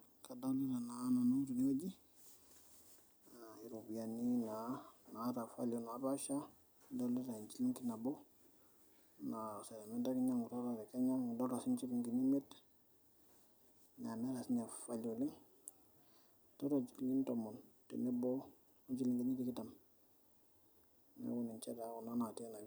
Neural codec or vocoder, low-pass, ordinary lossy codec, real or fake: none; none; none; real